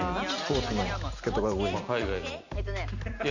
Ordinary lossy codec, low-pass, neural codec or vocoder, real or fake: none; 7.2 kHz; none; real